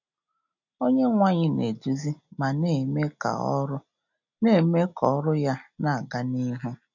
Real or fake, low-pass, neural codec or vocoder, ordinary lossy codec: real; 7.2 kHz; none; none